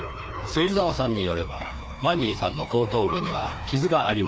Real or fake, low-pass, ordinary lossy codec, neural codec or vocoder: fake; none; none; codec, 16 kHz, 2 kbps, FreqCodec, larger model